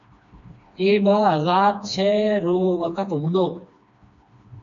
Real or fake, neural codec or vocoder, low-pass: fake; codec, 16 kHz, 2 kbps, FreqCodec, smaller model; 7.2 kHz